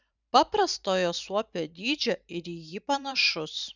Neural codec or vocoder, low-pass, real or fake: vocoder, 44.1 kHz, 128 mel bands every 512 samples, BigVGAN v2; 7.2 kHz; fake